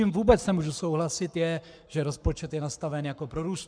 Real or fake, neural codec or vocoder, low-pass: fake; codec, 44.1 kHz, 7.8 kbps, Pupu-Codec; 9.9 kHz